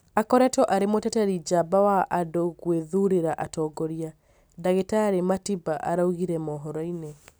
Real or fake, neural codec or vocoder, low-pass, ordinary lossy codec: real; none; none; none